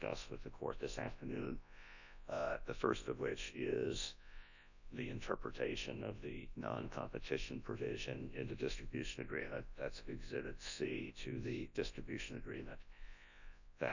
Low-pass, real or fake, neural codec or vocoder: 7.2 kHz; fake; codec, 24 kHz, 0.9 kbps, WavTokenizer, large speech release